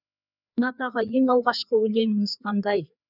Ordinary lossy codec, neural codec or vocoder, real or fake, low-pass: none; codec, 16 kHz, 2 kbps, FreqCodec, larger model; fake; 5.4 kHz